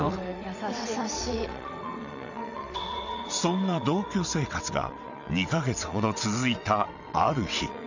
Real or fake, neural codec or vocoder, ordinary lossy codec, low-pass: fake; vocoder, 22.05 kHz, 80 mel bands, WaveNeXt; none; 7.2 kHz